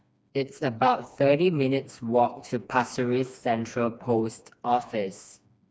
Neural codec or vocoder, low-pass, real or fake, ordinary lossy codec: codec, 16 kHz, 2 kbps, FreqCodec, smaller model; none; fake; none